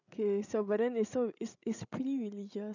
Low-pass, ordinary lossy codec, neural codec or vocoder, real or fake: 7.2 kHz; none; codec, 16 kHz, 16 kbps, FreqCodec, larger model; fake